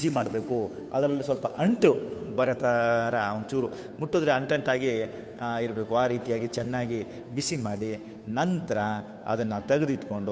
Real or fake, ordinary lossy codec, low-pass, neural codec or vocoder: fake; none; none; codec, 16 kHz, 2 kbps, FunCodec, trained on Chinese and English, 25 frames a second